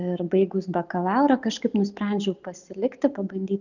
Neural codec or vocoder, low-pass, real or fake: none; 7.2 kHz; real